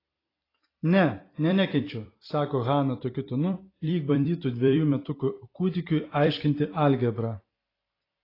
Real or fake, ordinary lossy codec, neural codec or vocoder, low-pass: fake; AAC, 24 kbps; vocoder, 44.1 kHz, 128 mel bands every 256 samples, BigVGAN v2; 5.4 kHz